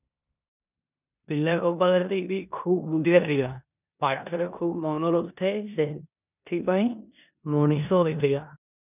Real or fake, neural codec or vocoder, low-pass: fake; codec, 16 kHz in and 24 kHz out, 0.9 kbps, LongCat-Audio-Codec, four codebook decoder; 3.6 kHz